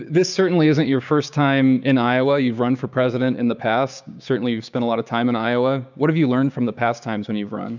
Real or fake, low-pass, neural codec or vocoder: fake; 7.2 kHz; codec, 16 kHz, 6 kbps, DAC